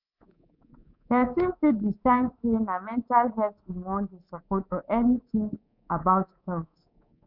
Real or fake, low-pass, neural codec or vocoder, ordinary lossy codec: fake; 5.4 kHz; codec, 24 kHz, 3.1 kbps, DualCodec; none